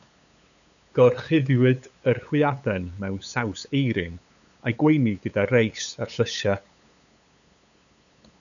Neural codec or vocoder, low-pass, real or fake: codec, 16 kHz, 8 kbps, FunCodec, trained on LibriTTS, 25 frames a second; 7.2 kHz; fake